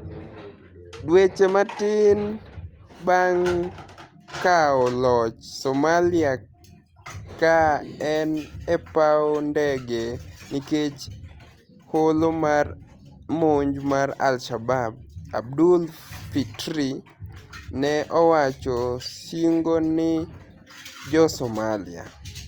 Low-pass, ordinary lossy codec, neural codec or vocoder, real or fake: 19.8 kHz; Opus, 32 kbps; none; real